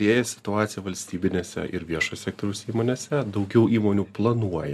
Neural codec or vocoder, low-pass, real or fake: none; 14.4 kHz; real